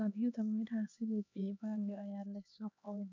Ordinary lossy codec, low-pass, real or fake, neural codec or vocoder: none; 7.2 kHz; fake; codec, 16 kHz, 2 kbps, X-Codec, HuBERT features, trained on LibriSpeech